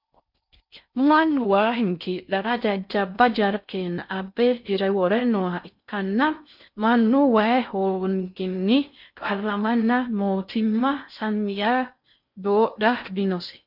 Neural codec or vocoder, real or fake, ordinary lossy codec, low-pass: codec, 16 kHz in and 24 kHz out, 0.6 kbps, FocalCodec, streaming, 4096 codes; fake; MP3, 48 kbps; 5.4 kHz